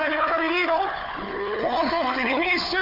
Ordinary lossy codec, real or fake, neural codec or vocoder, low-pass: none; fake; codec, 16 kHz, 4 kbps, FunCodec, trained on Chinese and English, 50 frames a second; 5.4 kHz